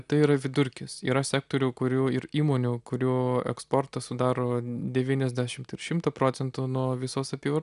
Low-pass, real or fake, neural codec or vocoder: 10.8 kHz; real; none